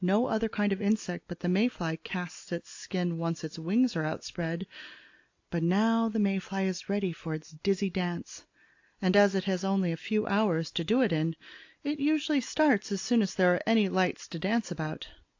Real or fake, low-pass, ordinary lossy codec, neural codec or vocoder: real; 7.2 kHz; AAC, 48 kbps; none